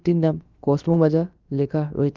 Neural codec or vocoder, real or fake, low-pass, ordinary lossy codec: codec, 16 kHz, about 1 kbps, DyCAST, with the encoder's durations; fake; 7.2 kHz; Opus, 32 kbps